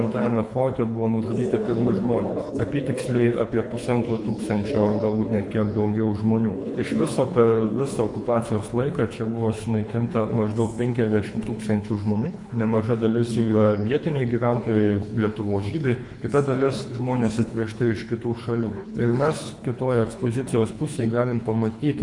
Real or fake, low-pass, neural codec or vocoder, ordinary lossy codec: fake; 10.8 kHz; codec, 24 kHz, 3 kbps, HILCodec; MP3, 64 kbps